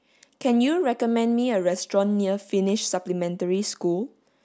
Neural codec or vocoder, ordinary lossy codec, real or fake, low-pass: none; none; real; none